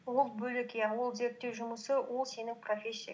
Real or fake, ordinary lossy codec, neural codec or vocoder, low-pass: real; none; none; none